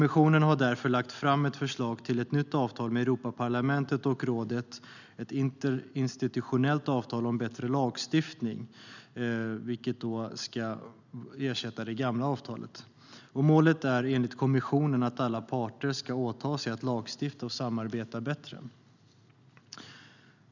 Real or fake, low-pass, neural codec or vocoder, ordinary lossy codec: real; 7.2 kHz; none; none